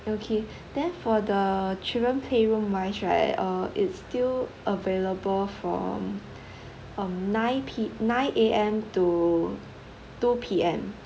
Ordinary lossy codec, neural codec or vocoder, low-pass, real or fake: none; none; none; real